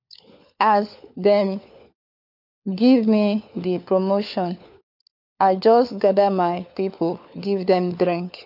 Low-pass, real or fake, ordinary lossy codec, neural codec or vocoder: 5.4 kHz; fake; none; codec, 16 kHz, 4 kbps, FunCodec, trained on LibriTTS, 50 frames a second